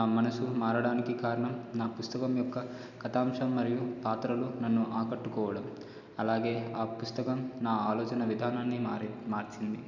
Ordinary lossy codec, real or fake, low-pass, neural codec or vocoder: none; real; 7.2 kHz; none